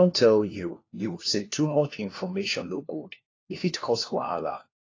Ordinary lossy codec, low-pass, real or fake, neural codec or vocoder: AAC, 32 kbps; 7.2 kHz; fake; codec, 16 kHz, 1 kbps, FunCodec, trained on LibriTTS, 50 frames a second